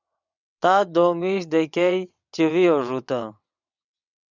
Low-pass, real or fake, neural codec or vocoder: 7.2 kHz; fake; vocoder, 22.05 kHz, 80 mel bands, WaveNeXt